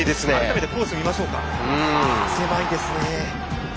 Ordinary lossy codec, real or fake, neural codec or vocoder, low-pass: none; real; none; none